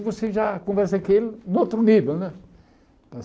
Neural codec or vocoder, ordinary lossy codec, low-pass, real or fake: none; none; none; real